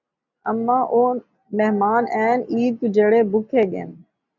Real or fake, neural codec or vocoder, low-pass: real; none; 7.2 kHz